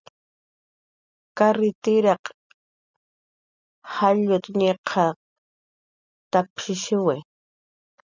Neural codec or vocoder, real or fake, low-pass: none; real; 7.2 kHz